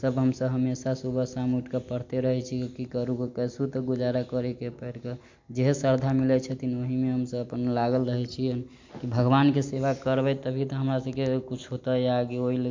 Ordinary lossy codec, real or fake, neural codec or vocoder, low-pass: MP3, 48 kbps; real; none; 7.2 kHz